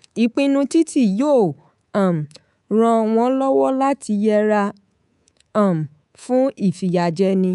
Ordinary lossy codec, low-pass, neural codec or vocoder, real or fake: none; 10.8 kHz; codec, 24 kHz, 3.1 kbps, DualCodec; fake